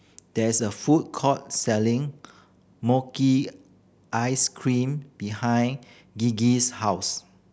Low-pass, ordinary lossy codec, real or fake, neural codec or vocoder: none; none; real; none